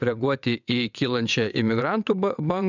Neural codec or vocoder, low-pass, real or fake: vocoder, 22.05 kHz, 80 mel bands, WaveNeXt; 7.2 kHz; fake